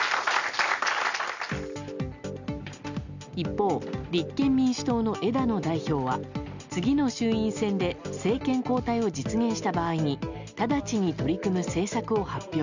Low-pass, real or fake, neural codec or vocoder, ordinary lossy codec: 7.2 kHz; real; none; none